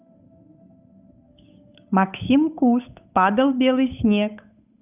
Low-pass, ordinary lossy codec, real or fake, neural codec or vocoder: 3.6 kHz; none; fake; codec, 16 kHz, 8 kbps, FunCodec, trained on Chinese and English, 25 frames a second